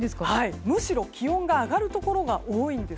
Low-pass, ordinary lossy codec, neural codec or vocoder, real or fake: none; none; none; real